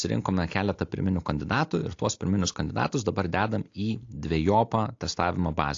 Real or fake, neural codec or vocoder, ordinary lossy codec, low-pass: real; none; AAC, 48 kbps; 7.2 kHz